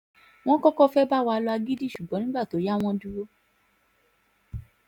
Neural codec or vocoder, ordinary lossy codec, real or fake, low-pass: none; none; real; 19.8 kHz